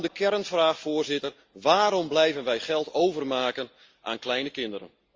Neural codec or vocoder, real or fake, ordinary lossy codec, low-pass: none; real; Opus, 32 kbps; 7.2 kHz